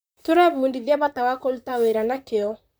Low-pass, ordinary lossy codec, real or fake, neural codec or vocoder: none; none; fake; vocoder, 44.1 kHz, 128 mel bands, Pupu-Vocoder